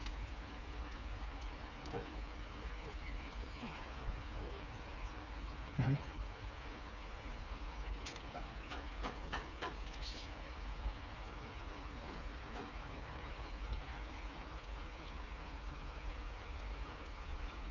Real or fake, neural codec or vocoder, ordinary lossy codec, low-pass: fake; codec, 16 kHz, 4 kbps, FreqCodec, smaller model; none; 7.2 kHz